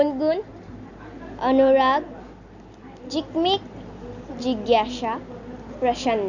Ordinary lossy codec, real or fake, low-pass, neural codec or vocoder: none; real; 7.2 kHz; none